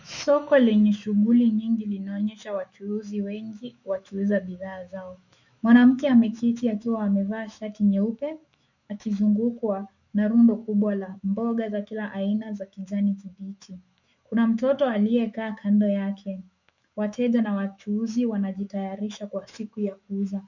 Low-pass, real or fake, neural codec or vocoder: 7.2 kHz; fake; autoencoder, 48 kHz, 128 numbers a frame, DAC-VAE, trained on Japanese speech